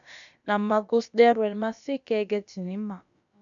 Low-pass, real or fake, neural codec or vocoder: 7.2 kHz; fake; codec, 16 kHz, about 1 kbps, DyCAST, with the encoder's durations